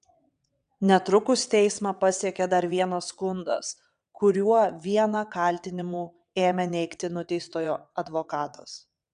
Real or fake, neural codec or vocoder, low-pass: fake; vocoder, 22.05 kHz, 80 mel bands, WaveNeXt; 9.9 kHz